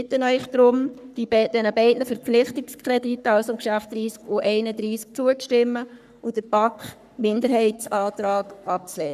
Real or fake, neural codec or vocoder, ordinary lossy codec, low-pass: fake; codec, 44.1 kHz, 3.4 kbps, Pupu-Codec; none; 14.4 kHz